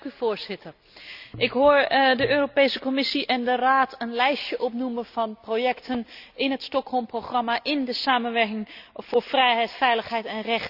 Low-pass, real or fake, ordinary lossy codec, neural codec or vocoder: 5.4 kHz; real; none; none